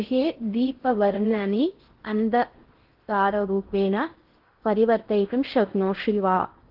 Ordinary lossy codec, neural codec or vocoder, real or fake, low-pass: Opus, 16 kbps; codec, 16 kHz in and 24 kHz out, 0.6 kbps, FocalCodec, streaming, 4096 codes; fake; 5.4 kHz